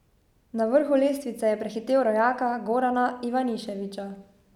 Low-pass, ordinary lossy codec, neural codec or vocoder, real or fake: 19.8 kHz; none; none; real